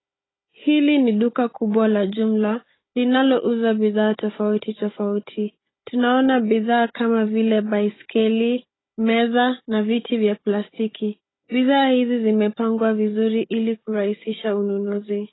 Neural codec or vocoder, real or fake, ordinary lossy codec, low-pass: codec, 16 kHz, 16 kbps, FunCodec, trained on Chinese and English, 50 frames a second; fake; AAC, 16 kbps; 7.2 kHz